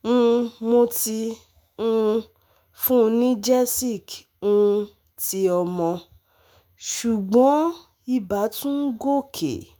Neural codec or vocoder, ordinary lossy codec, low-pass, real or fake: autoencoder, 48 kHz, 128 numbers a frame, DAC-VAE, trained on Japanese speech; none; none; fake